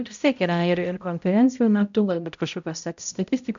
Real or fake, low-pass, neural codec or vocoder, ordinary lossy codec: fake; 7.2 kHz; codec, 16 kHz, 0.5 kbps, X-Codec, HuBERT features, trained on balanced general audio; MP3, 64 kbps